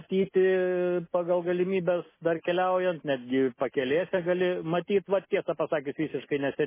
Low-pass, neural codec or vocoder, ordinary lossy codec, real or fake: 3.6 kHz; none; MP3, 16 kbps; real